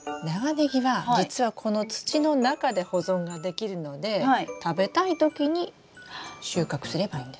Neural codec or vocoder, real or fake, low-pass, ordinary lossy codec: none; real; none; none